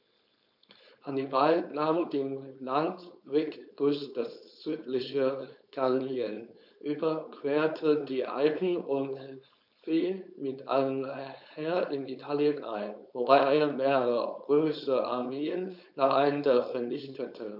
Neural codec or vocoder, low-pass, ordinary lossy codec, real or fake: codec, 16 kHz, 4.8 kbps, FACodec; 5.4 kHz; none; fake